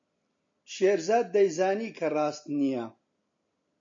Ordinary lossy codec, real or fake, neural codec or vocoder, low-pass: MP3, 48 kbps; real; none; 7.2 kHz